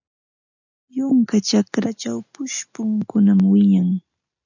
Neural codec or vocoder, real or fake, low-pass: none; real; 7.2 kHz